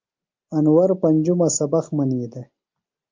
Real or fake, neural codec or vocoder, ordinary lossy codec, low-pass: real; none; Opus, 24 kbps; 7.2 kHz